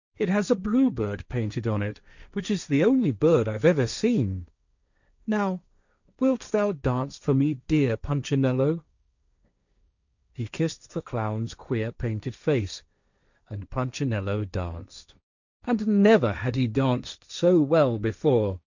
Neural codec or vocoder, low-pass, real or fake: codec, 16 kHz, 1.1 kbps, Voila-Tokenizer; 7.2 kHz; fake